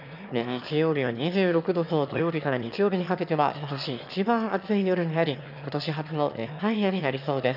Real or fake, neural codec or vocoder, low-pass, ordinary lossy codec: fake; autoencoder, 22.05 kHz, a latent of 192 numbers a frame, VITS, trained on one speaker; 5.4 kHz; none